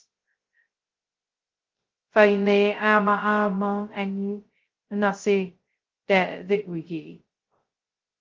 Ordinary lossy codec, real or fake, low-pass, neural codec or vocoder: Opus, 16 kbps; fake; 7.2 kHz; codec, 16 kHz, 0.2 kbps, FocalCodec